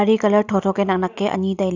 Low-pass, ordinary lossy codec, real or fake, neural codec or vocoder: 7.2 kHz; none; real; none